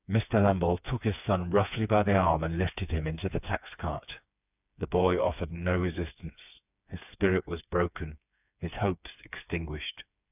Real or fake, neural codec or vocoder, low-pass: fake; codec, 16 kHz, 4 kbps, FreqCodec, smaller model; 3.6 kHz